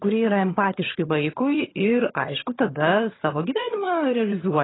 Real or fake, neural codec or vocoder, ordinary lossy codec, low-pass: fake; vocoder, 22.05 kHz, 80 mel bands, HiFi-GAN; AAC, 16 kbps; 7.2 kHz